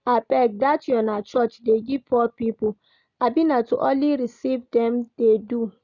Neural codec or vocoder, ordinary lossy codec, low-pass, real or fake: none; none; 7.2 kHz; real